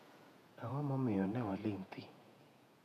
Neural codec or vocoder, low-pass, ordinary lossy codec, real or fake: vocoder, 48 kHz, 128 mel bands, Vocos; 14.4 kHz; none; fake